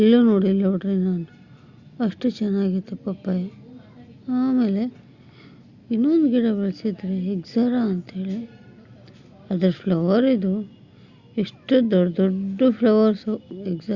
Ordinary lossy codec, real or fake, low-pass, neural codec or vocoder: Opus, 64 kbps; real; 7.2 kHz; none